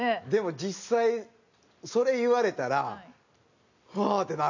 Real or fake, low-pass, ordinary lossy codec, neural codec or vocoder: real; 7.2 kHz; none; none